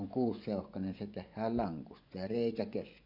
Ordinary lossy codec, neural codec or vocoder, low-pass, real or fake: none; vocoder, 24 kHz, 100 mel bands, Vocos; 5.4 kHz; fake